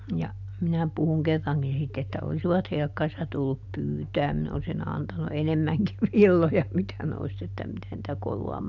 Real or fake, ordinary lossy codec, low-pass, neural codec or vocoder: real; none; 7.2 kHz; none